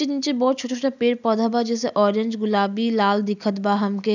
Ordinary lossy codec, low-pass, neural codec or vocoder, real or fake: none; 7.2 kHz; none; real